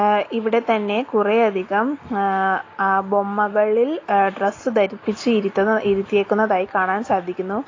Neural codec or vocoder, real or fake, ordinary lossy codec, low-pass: none; real; AAC, 32 kbps; 7.2 kHz